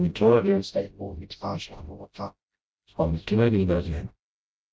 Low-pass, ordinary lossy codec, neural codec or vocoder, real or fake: none; none; codec, 16 kHz, 0.5 kbps, FreqCodec, smaller model; fake